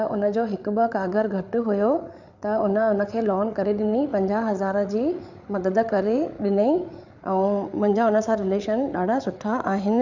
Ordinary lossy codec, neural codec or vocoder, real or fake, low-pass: none; codec, 16 kHz, 8 kbps, FreqCodec, larger model; fake; 7.2 kHz